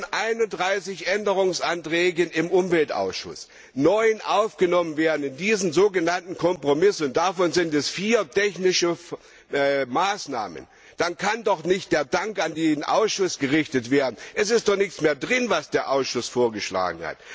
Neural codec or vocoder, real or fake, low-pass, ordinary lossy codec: none; real; none; none